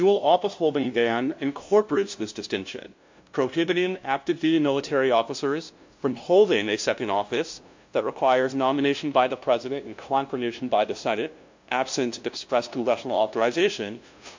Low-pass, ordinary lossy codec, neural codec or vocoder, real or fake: 7.2 kHz; MP3, 48 kbps; codec, 16 kHz, 0.5 kbps, FunCodec, trained on LibriTTS, 25 frames a second; fake